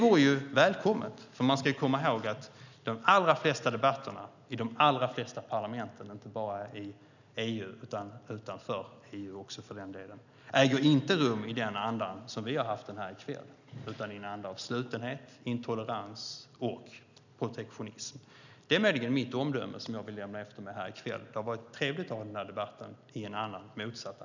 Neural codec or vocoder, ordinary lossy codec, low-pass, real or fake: none; none; 7.2 kHz; real